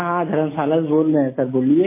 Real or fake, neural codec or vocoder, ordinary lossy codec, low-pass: real; none; MP3, 16 kbps; 3.6 kHz